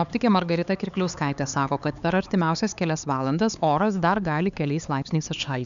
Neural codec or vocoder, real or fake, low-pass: codec, 16 kHz, 4 kbps, X-Codec, HuBERT features, trained on LibriSpeech; fake; 7.2 kHz